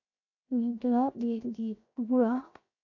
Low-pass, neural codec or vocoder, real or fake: 7.2 kHz; codec, 16 kHz, 0.7 kbps, FocalCodec; fake